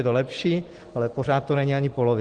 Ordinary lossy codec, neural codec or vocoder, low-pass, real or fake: Opus, 16 kbps; none; 9.9 kHz; real